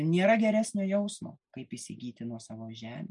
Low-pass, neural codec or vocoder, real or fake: 10.8 kHz; none; real